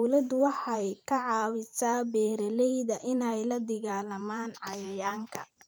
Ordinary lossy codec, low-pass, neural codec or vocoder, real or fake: none; none; vocoder, 44.1 kHz, 128 mel bands every 512 samples, BigVGAN v2; fake